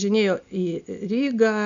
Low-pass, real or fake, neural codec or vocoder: 7.2 kHz; real; none